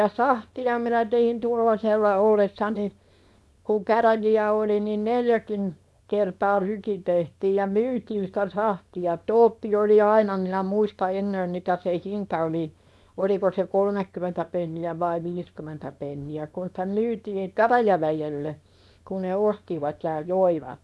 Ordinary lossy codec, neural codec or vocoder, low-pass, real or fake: none; codec, 24 kHz, 0.9 kbps, WavTokenizer, small release; none; fake